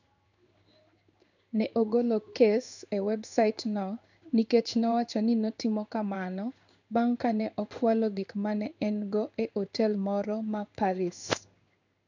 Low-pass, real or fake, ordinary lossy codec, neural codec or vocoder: 7.2 kHz; fake; none; codec, 16 kHz in and 24 kHz out, 1 kbps, XY-Tokenizer